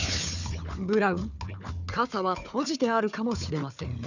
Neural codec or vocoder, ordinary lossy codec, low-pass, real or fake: codec, 16 kHz, 4 kbps, FunCodec, trained on Chinese and English, 50 frames a second; none; 7.2 kHz; fake